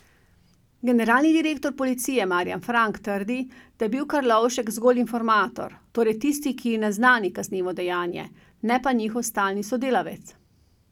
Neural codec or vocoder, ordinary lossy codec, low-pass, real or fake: none; none; 19.8 kHz; real